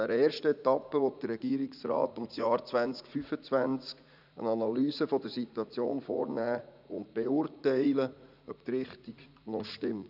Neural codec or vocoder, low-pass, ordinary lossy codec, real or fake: vocoder, 44.1 kHz, 80 mel bands, Vocos; 5.4 kHz; none; fake